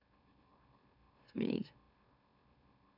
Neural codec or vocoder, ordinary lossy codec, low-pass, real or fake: autoencoder, 44.1 kHz, a latent of 192 numbers a frame, MeloTTS; none; 5.4 kHz; fake